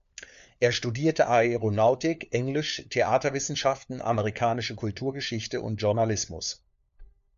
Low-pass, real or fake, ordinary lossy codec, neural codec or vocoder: 7.2 kHz; fake; AAC, 96 kbps; codec, 16 kHz, 4 kbps, FunCodec, trained on LibriTTS, 50 frames a second